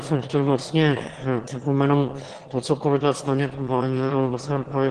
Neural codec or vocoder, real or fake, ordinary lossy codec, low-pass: autoencoder, 22.05 kHz, a latent of 192 numbers a frame, VITS, trained on one speaker; fake; Opus, 16 kbps; 9.9 kHz